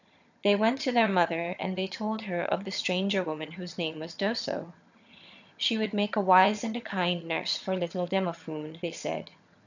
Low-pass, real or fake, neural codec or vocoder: 7.2 kHz; fake; vocoder, 22.05 kHz, 80 mel bands, HiFi-GAN